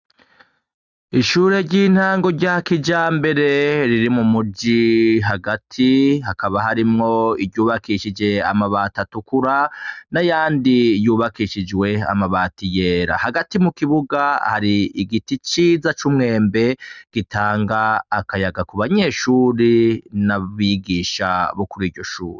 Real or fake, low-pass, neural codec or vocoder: real; 7.2 kHz; none